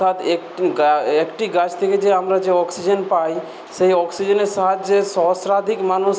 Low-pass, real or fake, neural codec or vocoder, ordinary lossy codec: none; real; none; none